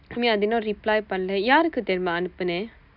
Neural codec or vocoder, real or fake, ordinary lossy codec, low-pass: none; real; none; 5.4 kHz